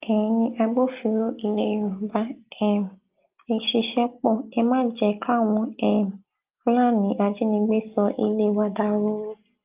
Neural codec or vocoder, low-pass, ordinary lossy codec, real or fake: none; 3.6 kHz; Opus, 32 kbps; real